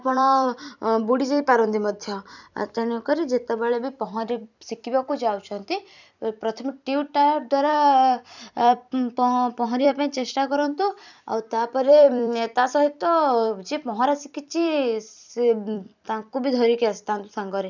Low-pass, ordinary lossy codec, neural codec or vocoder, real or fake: 7.2 kHz; none; vocoder, 44.1 kHz, 128 mel bands every 256 samples, BigVGAN v2; fake